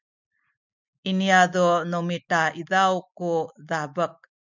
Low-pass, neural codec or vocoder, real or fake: 7.2 kHz; none; real